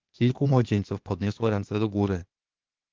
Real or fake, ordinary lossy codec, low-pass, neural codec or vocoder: fake; Opus, 32 kbps; 7.2 kHz; codec, 16 kHz, 0.8 kbps, ZipCodec